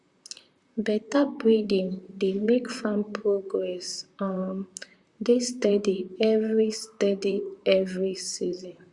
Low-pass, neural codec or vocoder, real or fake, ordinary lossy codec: 10.8 kHz; vocoder, 44.1 kHz, 128 mel bands, Pupu-Vocoder; fake; Opus, 64 kbps